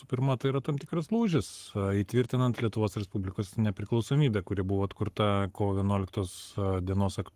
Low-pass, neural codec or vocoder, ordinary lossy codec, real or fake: 14.4 kHz; codec, 44.1 kHz, 7.8 kbps, Pupu-Codec; Opus, 24 kbps; fake